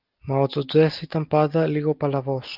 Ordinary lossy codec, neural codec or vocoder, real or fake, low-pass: Opus, 32 kbps; none; real; 5.4 kHz